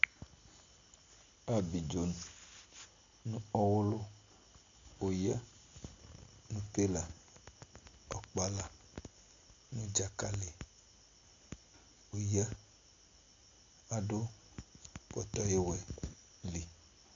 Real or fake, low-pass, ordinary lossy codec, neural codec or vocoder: real; 7.2 kHz; AAC, 64 kbps; none